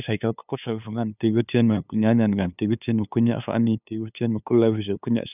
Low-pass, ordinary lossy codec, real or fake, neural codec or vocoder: 3.6 kHz; none; fake; codec, 16 kHz, 2 kbps, FunCodec, trained on LibriTTS, 25 frames a second